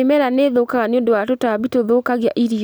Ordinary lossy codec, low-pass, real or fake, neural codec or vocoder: none; none; real; none